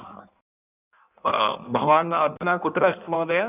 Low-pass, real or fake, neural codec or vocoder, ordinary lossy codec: 3.6 kHz; fake; codec, 16 kHz in and 24 kHz out, 1.1 kbps, FireRedTTS-2 codec; none